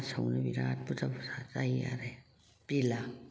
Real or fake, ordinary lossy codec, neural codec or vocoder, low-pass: real; none; none; none